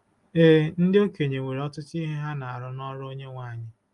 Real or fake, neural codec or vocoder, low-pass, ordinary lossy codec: real; none; 10.8 kHz; Opus, 32 kbps